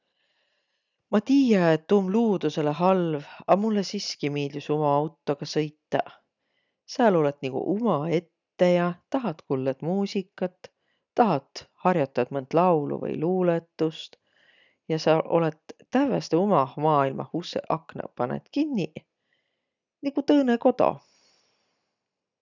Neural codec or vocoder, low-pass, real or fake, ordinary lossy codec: none; 7.2 kHz; real; none